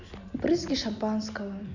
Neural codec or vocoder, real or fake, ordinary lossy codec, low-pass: none; real; none; 7.2 kHz